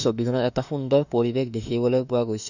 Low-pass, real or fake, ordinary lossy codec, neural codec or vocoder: 7.2 kHz; fake; none; autoencoder, 48 kHz, 32 numbers a frame, DAC-VAE, trained on Japanese speech